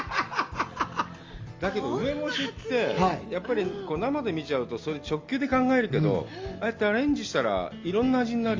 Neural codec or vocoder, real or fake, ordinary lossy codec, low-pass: none; real; Opus, 32 kbps; 7.2 kHz